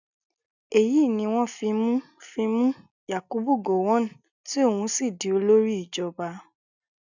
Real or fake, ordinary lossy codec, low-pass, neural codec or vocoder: real; none; 7.2 kHz; none